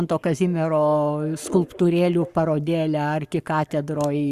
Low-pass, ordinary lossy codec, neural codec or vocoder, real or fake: 14.4 kHz; Opus, 64 kbps; codec, 44.1 kHz, 7.8 kbps, Pupu-Codec; fake